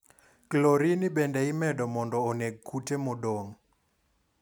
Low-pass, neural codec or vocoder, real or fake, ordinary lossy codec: none; none; real; none